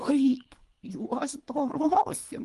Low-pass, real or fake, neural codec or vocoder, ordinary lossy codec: 10.8 kHz; fake; codec, 24 kHz, 3 kbps, HILCodec; Opus, 24 kbps